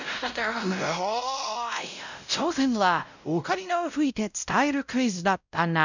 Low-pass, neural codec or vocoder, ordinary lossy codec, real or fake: 7.2 kHz; codec, 16 kHz, 0.5 kbps, X-Codec, WavLM features, trained on Multilingual LibriSpeech; none; fake